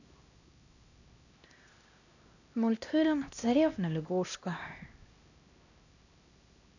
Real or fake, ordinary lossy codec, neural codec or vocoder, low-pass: fake; none; codec, 16 kHz, 1 kbps, X-Codec, HuBERT features, trained on LibriSpeech; 7.2 kHz